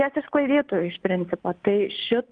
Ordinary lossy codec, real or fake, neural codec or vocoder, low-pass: Opus, 32 kbps; real; none; 9.9 kHz